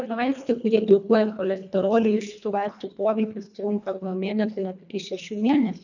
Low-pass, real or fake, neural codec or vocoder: 7.2 kHz; fake; codec, 24 kHz, 1.5 kbps, HILCodec